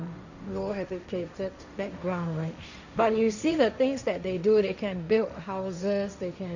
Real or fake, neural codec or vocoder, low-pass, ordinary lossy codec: fake; codec, 16 kHz, 1.1 kbps, Voila-Tokenizer; 7.2 kHz; none